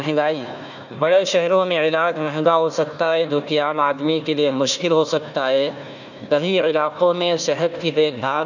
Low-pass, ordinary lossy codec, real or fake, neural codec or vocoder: 7.2 kHz; none; fake; codec, 16 kHz, 1 kbps, FunCodec, trained on Chinese and English, 50 frames a second